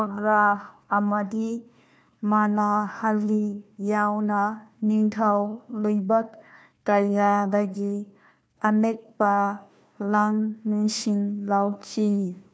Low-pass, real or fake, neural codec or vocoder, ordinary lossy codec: none; fake; codec, 16 kHz, 1 kbps, FunCodec, trained on Chinese and English, 50 frames a second; none